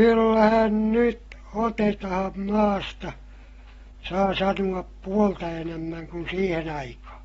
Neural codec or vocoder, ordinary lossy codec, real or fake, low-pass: none; AAC, 24 kbps; real; 19.8 kHz